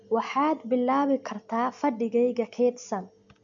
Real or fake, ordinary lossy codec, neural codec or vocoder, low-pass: real; MP3, 64 kbps; none; 7.2 kHz